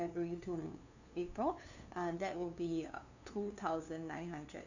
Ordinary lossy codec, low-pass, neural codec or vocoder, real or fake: none; 7.2 kHz; codec, 16 kHz, 2 kbps, FunCodec, trained on LibriTTS, 25 frames a second; fake